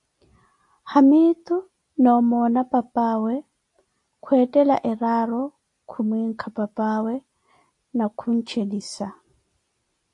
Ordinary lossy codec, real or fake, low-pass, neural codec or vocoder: AAC, 48 kbps; real; 10.8 kHz; none